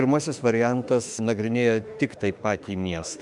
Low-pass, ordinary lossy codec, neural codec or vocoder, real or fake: 10.8 kHz; MP3, 96 kbps; autoencoder, 48 kHz, 32 numbers a frame, DAC-VAE, trained on Japanese speech; fake